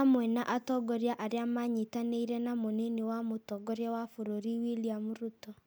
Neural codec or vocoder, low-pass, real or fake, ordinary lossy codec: none; none; real; none